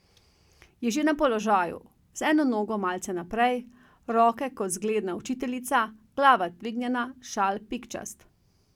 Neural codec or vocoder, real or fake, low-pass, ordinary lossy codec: none; real; 19.8 kHz; none